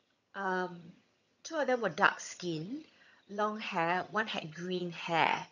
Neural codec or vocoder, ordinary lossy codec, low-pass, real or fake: vocoder, 22.05 kHz, 80 mel bands, HiFi-GAN; none; 7.2 kHz; fake